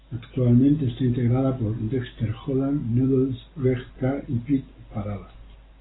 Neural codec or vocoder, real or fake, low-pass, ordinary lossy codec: none; real; 7.2 kHz; AAC, 16 kbps